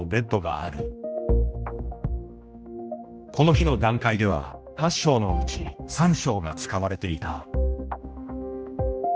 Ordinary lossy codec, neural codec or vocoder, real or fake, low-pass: none; codec, 16 kHz, 1 kbps, X-Codec, HuBERT features, trained on general audio; fake; none